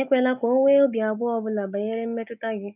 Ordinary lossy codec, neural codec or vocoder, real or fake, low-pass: none; none; real; 3.6 kHz